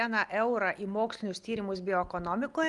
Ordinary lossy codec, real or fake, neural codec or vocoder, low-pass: Opus, 32 kbps; real; none; 10.8 kHz